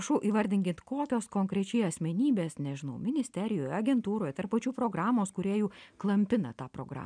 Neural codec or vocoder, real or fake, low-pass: none; real; 9.9 kHz